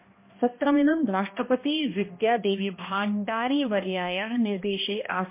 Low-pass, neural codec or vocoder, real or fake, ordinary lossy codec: 3.6 kHz; codec, 16 kHz, 1 kbps, X-Codec, HuBERT features, trained on balanced general audio; fake; MP3, 24 kbps